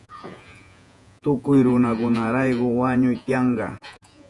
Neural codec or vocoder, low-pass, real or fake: vocoder, 48 kHz, 128 mel bands, Vocos; 10.8 kHz; fake